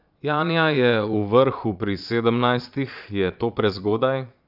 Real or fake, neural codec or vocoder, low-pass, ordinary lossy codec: fake; vocoder, 22.05 kHz, 80 mel bands, Vocos; 5.4 kHz; none